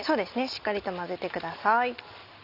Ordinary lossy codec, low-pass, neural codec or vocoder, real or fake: none; 5.4 kHz; none; real